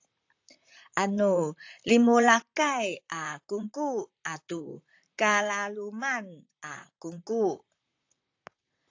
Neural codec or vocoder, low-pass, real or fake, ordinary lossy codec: vocoder, 44.1 kHz, 128 mel bands, Pupu-Vocoder; 7.2 kHz; fake; AAC, 48 kbps